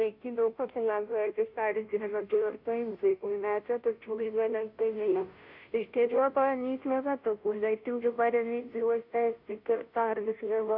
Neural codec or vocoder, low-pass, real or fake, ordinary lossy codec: codec, 16 kHz, 0.5 kbps, FunCodec, trained on Chinese and English, 25 frames a second; 5.4 kHz; fake; none